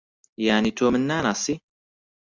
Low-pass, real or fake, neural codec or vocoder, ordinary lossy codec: 7.2 kHz; real; none; MP3, 64 kbps